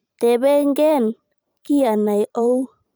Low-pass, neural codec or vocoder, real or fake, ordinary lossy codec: none; vocoder, 44.1 kHz, 128 mel bands every 512 samples, BigVGAN v2; fake; none